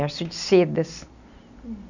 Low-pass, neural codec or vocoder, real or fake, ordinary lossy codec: 7.2 kHz; none; real; none